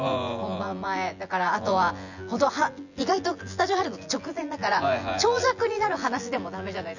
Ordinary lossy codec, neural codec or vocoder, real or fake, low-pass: none; vocoder, 24 kHz, 100 mel bands, Vocos; fake; 7.2 kHz